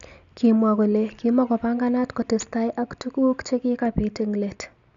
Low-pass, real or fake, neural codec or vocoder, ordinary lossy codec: 7.2 kHz; real; none; none